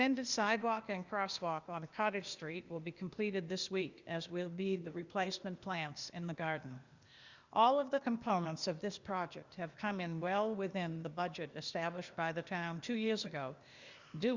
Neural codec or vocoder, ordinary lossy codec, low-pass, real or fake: codec, 16 kHz, 0.8 kbps, ZipCodec; Opus, 64 kbps; 7.2 kHz; fake